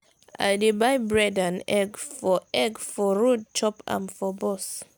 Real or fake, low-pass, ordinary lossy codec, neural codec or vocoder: real; none; none; none